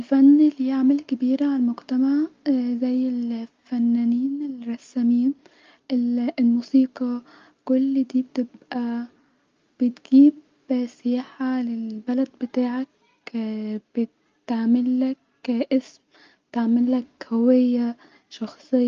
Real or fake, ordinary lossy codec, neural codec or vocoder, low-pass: real; Opus, 24 kbps; none; 7.2 kHz